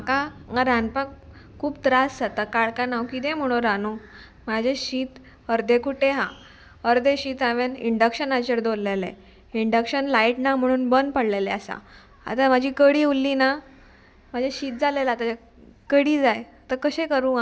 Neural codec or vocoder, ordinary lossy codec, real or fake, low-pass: none; none; real; none